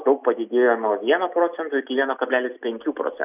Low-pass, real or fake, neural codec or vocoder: 3.6 kHz; real; none